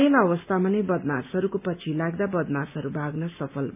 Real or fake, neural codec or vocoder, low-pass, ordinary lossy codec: real; none; 3.6 kHz; none